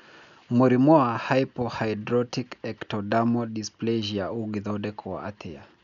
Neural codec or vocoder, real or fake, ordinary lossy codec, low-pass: none; real; none; 7.2 kHz